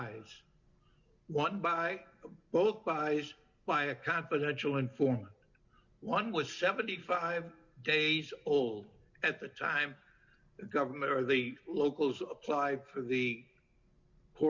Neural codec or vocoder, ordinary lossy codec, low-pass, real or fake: none; Opus, 64 kbps; 7.2 kHz; real